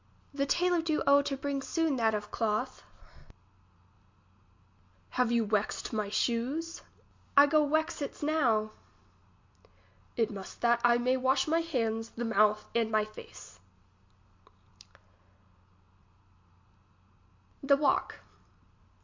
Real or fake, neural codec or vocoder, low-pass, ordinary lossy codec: real; none; 7.2 kHz; MP3, 48 kbps